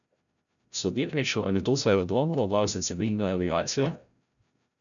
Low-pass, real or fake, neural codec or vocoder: 7.2 kHz; fake; codec, 16 kHz, 0.5 kbps, FreqCodec, larger model